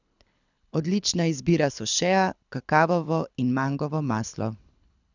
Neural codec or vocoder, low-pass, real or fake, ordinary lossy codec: codec, 24 kHz, 6 kbps, HILCodec; 7.2 kHz; fake; none